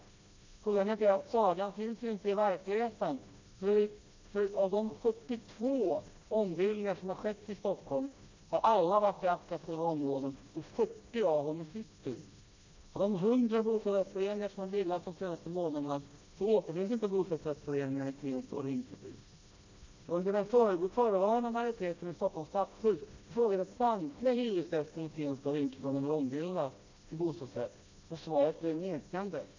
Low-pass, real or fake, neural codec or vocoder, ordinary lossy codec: 7.2 kHz; fake; codec, 16 kHz, 1 kbps, FreqCodec, smaller model; MP3, 64 kbps